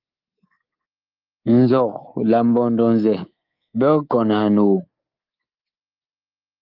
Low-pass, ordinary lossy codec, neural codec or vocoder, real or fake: 5.4 kHz; Opus, 24 kbps; codec, 24 kHz, 3.1 kbps, DualCodec; fake